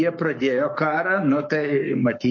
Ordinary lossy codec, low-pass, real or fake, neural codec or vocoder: MP3, 32 kbps; 7.2 kHz; fake; codec, 44.1 kHz, 7.8 kbps, Pupu-Codec